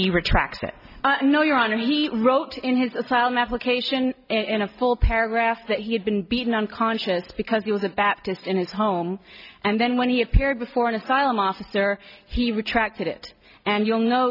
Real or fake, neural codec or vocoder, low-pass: real; none; 5.4 kHz